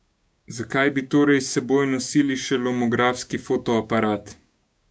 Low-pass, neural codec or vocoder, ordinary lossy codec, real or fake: none; codec, 16 kHz, 6 kbps, DAC; none; fake